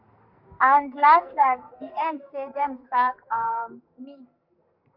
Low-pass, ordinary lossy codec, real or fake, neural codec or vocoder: 5.4 kHz; MP3, 48 kbps; fake; codec, 44.1 kHz, 2.6 kbps, SNAC